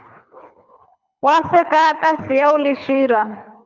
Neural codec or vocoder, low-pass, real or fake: codec, 24 kHz, 3 kbps, HILCodec; 7.2 kHz; fake